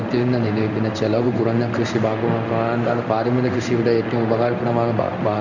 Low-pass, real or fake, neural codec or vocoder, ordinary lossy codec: 7.2 kHz; fake; codec, 16 kHz in and 24 kHz out, 1 kbps, XY-Tokenizer; none